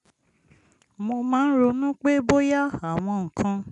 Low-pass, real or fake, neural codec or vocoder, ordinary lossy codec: 10.8 kHz; real; none; none